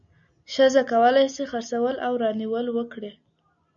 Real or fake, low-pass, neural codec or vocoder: real; 7.2 kHz; none